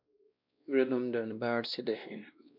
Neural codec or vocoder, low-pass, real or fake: codec, 16 kHz, 1 kbps, X-Codec, WavLM features, trained on Multilingual LibriSpeech; 5.4 kHz; fake